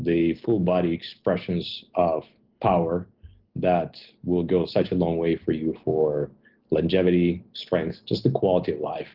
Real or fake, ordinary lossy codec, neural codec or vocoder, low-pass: real; Opus, 16 kbps; none; 5.4 kHz